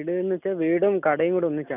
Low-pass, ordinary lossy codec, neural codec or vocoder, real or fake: 3.6 kHz; none; none; real